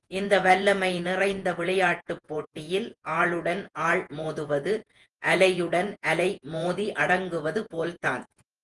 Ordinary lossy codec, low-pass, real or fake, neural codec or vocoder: Opus, 24 kbps; 10.8 kHz; fake; vocoder, 48 kHz, 128 mel bands, Vocos